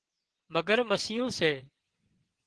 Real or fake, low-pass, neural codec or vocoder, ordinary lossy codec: fake; 9.9 kHz; vocoder, 22.05 kHz, 80 mel bands, WaveNeXt; Opus, 16 kbps